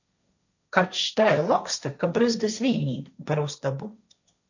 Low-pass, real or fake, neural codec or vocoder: 7.2 kHz; fake; codec, 16 kHz, 1.1 kbps, Voila-Tokenizer